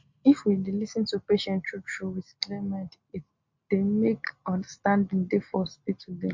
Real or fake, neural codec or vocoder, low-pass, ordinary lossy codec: real; none; 7.2 kHz; MP3, 48 kbps